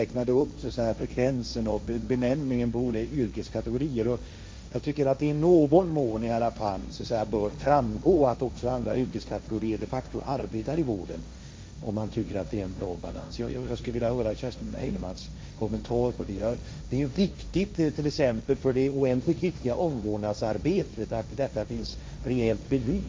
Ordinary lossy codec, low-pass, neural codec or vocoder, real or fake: none; none; codec, 16 kHz, 1.1 kbps, Voila-Tokenizer; fake